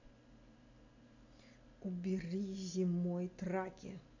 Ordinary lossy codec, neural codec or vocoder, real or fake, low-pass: none; none; real; 7.2 kHz